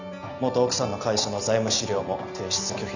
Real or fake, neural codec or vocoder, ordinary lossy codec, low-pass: real; none; none; 7.2 kHz